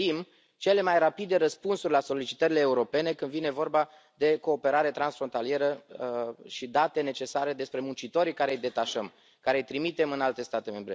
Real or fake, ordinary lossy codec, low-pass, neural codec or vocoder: real; none; none; none